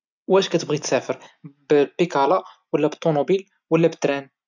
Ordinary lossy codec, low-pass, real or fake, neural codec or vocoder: none; 7.2 kHz; real; none